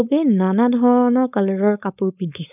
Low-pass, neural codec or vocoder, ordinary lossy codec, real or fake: 3.6 kHz; codec, 16 kHz, 4.8 kbps, FACodec; none; fake